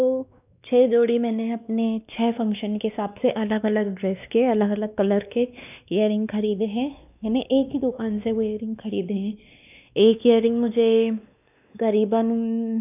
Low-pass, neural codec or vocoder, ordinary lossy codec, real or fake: 3.6 kHz; codec, 16 kHz, 2 kbps, X-Codec, WavLM features, trained on Multilingual LibriSpeech; none; fake